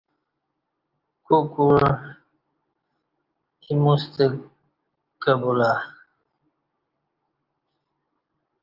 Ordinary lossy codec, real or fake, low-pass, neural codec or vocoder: Opus, 32 kbps; real; 5.4 kHz; none